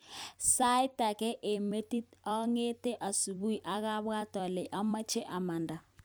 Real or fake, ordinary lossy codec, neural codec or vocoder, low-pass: fake; none; vocoder, 44.1 kHz, 128 mel bands every 256 samples, BigVGAN v2; none